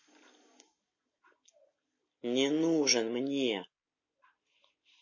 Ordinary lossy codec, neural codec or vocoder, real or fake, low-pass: MP3, 32 kbps; none; real; 7.2 kHz